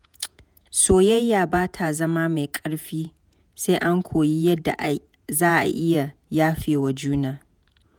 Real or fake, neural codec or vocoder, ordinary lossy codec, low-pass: fake; vocoder, 48 kHz, 128 mel bands, Vocos; none; none